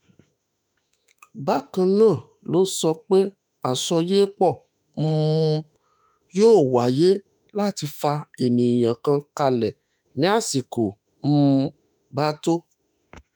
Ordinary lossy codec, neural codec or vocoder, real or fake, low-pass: none; autoencoder, 48 kHz, 32 numbers a frame, DAC-VAE, trained on Japanese speech; fake; none